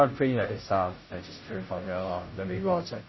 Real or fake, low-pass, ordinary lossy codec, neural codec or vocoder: fake; 7.2 kHz; MP3, 24 kbps; codec, 16 kHz, 0.5 kbps, FunCodec, trained on Chinese and English, 25 frames a second